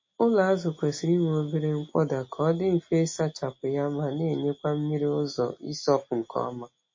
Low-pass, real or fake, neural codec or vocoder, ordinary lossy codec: 7.2 kHz; real; none; MP3, 32 kbps